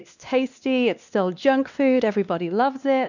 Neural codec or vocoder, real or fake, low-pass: codec, 16 kHz, 2 kbps, X-Codec, WavLM features, trained on Multilingual LibriSpeech; fake; 7.2 kHz